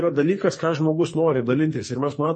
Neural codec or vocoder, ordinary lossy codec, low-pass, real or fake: codec, 44.1 kHz, 2.6 kbps, SNAC; MP3, 32 kbps; 10.8 kHz; fake